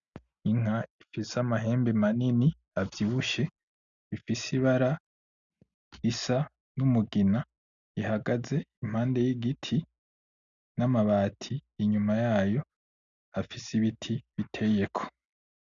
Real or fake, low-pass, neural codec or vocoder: real; 7.2 kHz; none